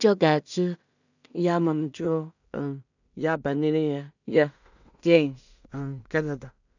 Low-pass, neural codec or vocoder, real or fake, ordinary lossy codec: 7.2 kHz; codec, 16 kHz in and 24 kHz out, 0.4 kbps, LongCat-Audio-Codec, two codebook decoder; fake; none